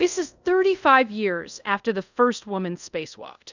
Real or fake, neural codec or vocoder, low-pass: fake; codec, 24 kHz, 0.5 kbps, DualCodec; 7.2 kHz